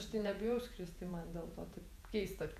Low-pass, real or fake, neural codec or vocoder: 14.4 kHz; fake; vocoder, 48 kHz, 128 mel bands, Vocos